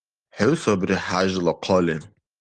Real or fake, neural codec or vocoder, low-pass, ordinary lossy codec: real; none; 9.9 kHz; Opus, 24 kbps